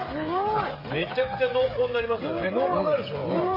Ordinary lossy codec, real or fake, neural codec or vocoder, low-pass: MP3, 24 kbps; fake; codec, 16 kHz, 16 kbps, FreqCodec, smaller model; 5.4 kHz